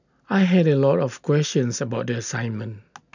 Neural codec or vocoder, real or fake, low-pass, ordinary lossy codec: none; real; 7.2 kHz; none